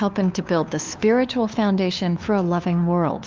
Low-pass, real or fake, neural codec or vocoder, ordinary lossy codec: 7.2 kHz; fake; codec, 16 kHz, 2 kbps, FunCodec, trained on LibriTTS, 25 frames a second; Opus, 32 kbps